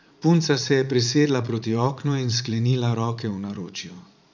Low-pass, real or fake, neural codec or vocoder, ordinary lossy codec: 7.2 kHz; fake; vocoder, 44.1 kHz, 80 mel bands, Vocos; none